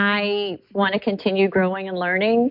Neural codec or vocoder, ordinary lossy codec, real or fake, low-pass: none; MP3, 48 kbps; real; 5.4 kHz